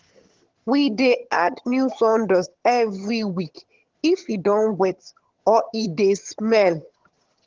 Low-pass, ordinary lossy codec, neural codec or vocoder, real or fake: 7.2 kHz; Opus, 16 kbps; vocoder, 22.05 kHz, 80 mel bands, HiFi-GAN; fake